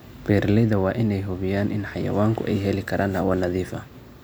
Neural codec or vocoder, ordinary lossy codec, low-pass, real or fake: none; none; none; real